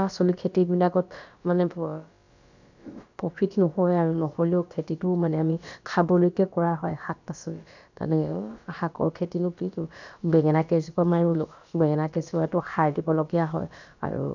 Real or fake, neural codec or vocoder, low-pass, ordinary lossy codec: fake; codec, 16 kHz, about 1 kbps, DyCAST, with the encoder's durations; 7.2 kHz; none